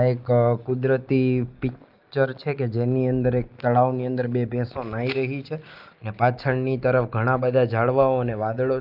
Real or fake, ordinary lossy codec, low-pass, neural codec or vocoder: real; Opus, 24 kbps; 5.4 kHz; none